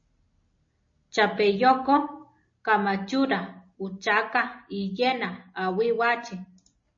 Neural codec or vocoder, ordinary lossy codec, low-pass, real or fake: none; MP3, 32 kbps; 7.2 kHz; real